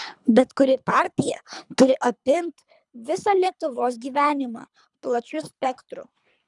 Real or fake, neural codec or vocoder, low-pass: fake; codec, 24 kHz, 3 kbps, HILCodec; 10.8 kHz